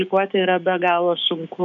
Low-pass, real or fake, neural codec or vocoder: 7.2 kHz; fake; codec, 16 kHz, 6 kbps, DAC